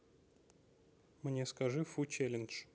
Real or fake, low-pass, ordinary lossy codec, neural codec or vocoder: real; none; none; none